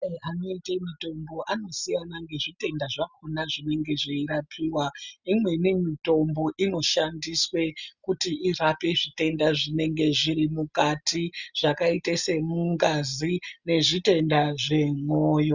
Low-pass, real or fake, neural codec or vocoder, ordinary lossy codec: 7.2 kHz; real; none; Opus, 64 kbps